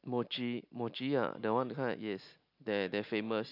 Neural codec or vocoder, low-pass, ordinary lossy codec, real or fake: none; 5.4 kHz; none; real